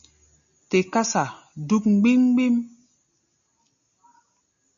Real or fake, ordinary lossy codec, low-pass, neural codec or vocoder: real; MP3, 96 kbps; 7.2 kHz; none